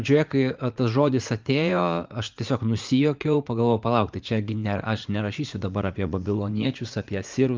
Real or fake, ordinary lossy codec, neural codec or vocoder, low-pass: fake; Opus, 24 kbps; vocoder, 44.1 kHz, 80 mel bands, Vocos; 7.2 kHz